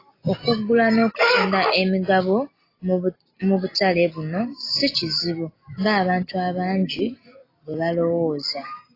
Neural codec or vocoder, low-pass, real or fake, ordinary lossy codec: none; 5.4 kHz; real; AAC, 24 kbps